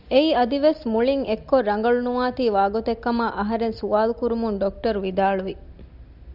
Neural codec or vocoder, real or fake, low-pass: none; real; 5.4 kHz